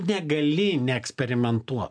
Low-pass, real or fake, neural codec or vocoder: 9.9 kHz; real; none